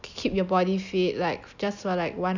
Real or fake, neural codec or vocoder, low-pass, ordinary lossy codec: real; none; 7.2 kHz; none